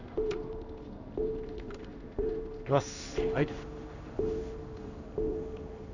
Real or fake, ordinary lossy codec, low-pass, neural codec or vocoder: fake; none; 7.2 kHz; codec, 16 kHz in and 24 kHz out, 1 kbps, XY-Tokenizer